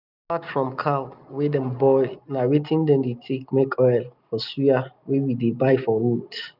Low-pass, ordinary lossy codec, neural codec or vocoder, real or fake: 5.4 kHz; none; none; real